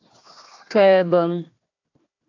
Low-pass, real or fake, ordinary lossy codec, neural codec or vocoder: 7.2 kHz; fake; AAC, 48 kbps; codec, 16 kHz, 1 kbps, FunCodec, trained on Chinese and English, 50 frames a second